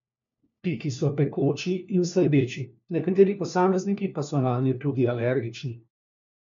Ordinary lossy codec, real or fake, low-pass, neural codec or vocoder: MP3, 64 kbps; fake; 7.2 kHz; codec, 16 kHz, 1 kbps, FunCodec, trained on LibriTTS, 50 frames a second